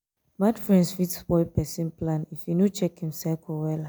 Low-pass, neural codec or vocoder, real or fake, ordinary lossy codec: none; none; real; none